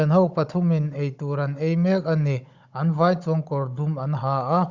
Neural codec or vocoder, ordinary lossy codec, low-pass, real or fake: codec, 16 kHz, 16 kbps, FunCodec, trained on Chinese and English, 50 frames a second; Opus, 64 kbps; 7.2 kHz; fake